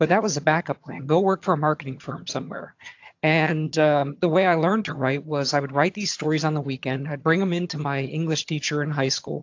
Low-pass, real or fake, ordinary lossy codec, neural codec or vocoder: 7.2 kHz; fake; AAC, 48 kbps; vocoder, 22.05 kHz, 80 mel bands, HiFi-GAN